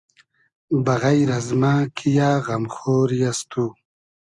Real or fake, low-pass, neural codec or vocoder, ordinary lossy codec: real; 10.8 kHz; none; Opus, 64 kbps